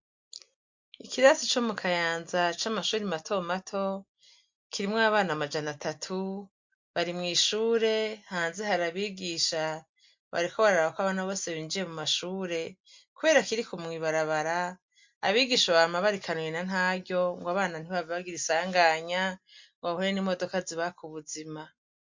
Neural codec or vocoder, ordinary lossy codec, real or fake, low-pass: none; MP3, 48 kbps; real; 7.2 kHz